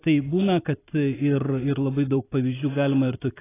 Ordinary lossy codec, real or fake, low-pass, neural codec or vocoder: AAC, 16 kbps; fake; 3.6 kHz; vocoder, 44.1 kHz, 128 mel bands every 256 samples, BigVGAN v2